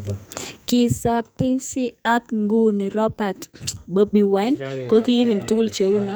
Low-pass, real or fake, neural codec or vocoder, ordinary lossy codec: none; fake; codec, 44.1 kHz, 2.6 kbps, SNAC; none